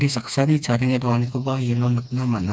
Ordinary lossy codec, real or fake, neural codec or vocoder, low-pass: none; fake; codec, 16 kHz, 2 kbps, FreqCodec, smaller model; none